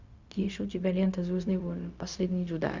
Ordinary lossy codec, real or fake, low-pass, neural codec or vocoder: Opus, 64 kbps; fake; 7.2 kHz; codec, 16 kHz, 0.4 kbps, LongCat-Audio-Codec